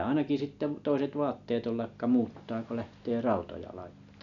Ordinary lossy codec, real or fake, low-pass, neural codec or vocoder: none; real; 7.2 kHz; none